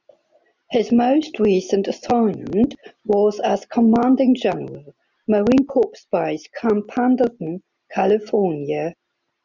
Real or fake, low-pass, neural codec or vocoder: real; 7.2 kHz; none